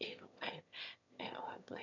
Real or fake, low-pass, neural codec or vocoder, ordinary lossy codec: fake; 7.2 kHz; autoencoder, 22.05 kHz, a latent of 192 numbers a frame, VITS, trained on one speaker; none